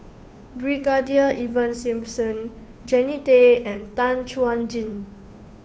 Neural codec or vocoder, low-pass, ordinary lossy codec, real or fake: codec, 16 kHz, 2 kbps, FunCodec, trained on Chinese and English, 25 frames a second; none; none; fake